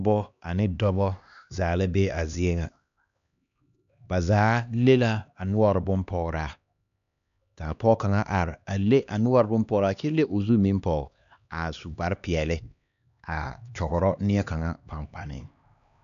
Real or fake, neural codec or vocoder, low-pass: fake; codec, 16 kHz, 2 kbps, X-Codec, HuBERT features, trained on LibriSpeech; 7.2 kHz